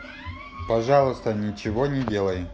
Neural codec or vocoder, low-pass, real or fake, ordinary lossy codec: none; none; real; none